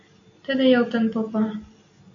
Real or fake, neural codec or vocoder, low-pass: real; none; 7.2 kHz